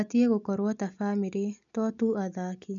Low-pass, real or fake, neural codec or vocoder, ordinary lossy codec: 7.2 kHz; real; none; none